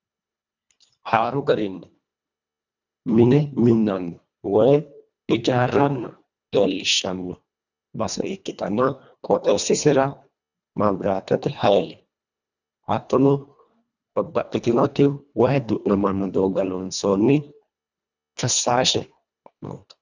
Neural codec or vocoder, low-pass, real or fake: codec, 24 kHz, 1.5 kbps, HILCodec; 7.2 kHz; fake